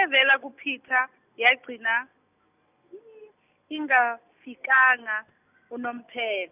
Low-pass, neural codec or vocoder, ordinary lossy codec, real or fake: 3.6 kHz; none; none; real